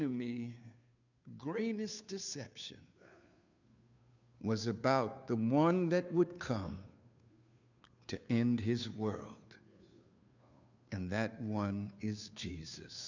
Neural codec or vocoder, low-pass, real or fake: codec, 16 kHz, 2 kbps, FunCodec, trained on Chinese and English, 25 frames a second; 7.2 kHz; fake